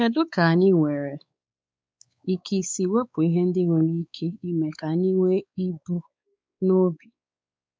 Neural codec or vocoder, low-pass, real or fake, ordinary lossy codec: codec, 16 kHz, 4 kbps, X-Codec, WavLM features, trained on Multilingual LibriSpeech; none; fake; none